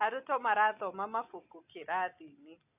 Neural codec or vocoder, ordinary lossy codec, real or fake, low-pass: none; AAC, 32 kbps; real; 3.6 kHz